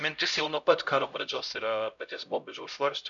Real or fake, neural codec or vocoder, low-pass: fake; codec, 16 kHz, 0.5 kbps, X-Codec, HuBERT features, trained on LibriSpeech; 7.2 kHz